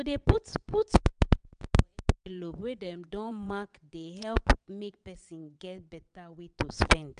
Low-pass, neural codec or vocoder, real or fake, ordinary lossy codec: 9.9 kHz; vocoder, 22.05 kHz, 80 mel bands, WaveNeXt; fake; none